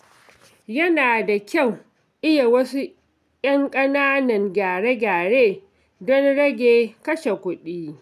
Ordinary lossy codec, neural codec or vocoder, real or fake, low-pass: none; none; real; 14.4 kHz